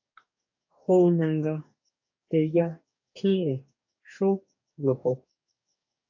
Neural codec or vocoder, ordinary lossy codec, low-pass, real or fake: codec, 44.1 kHz, 2.6 kbps, DAC; AAC, 48 kbps; 7.2 kHz; fake